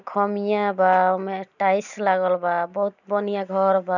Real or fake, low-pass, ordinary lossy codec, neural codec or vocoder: real; 7.2 kHz; none; none